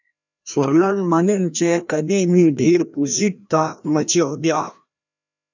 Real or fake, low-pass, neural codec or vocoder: fake; 7.2 kHz; codec, 16 kHz, 1 kbps, FreqCodec, larger model